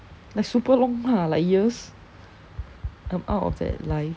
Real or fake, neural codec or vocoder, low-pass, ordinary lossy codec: real; none; none; none